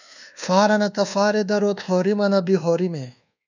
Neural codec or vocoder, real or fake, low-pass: codec, 24 kHz, 1.2 kbps, DualCodec; fake; 7.2 kHz